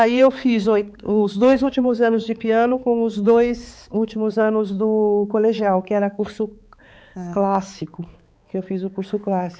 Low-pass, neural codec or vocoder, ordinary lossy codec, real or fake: none; codec, 16 kHz, 4 kbps, X-Codec, HuBERT features, trained on balanced general audio; none; fake